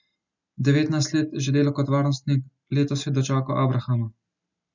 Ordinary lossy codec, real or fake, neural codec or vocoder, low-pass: none; real; none; 7.2 kHz